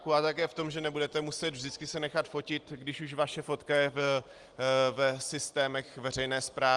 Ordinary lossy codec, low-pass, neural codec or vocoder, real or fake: Opus, 24 kbps; 10.8 kHz; none; real